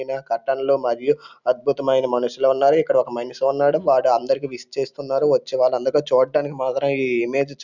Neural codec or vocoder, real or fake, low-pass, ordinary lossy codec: none; real; 7.2 kHz; none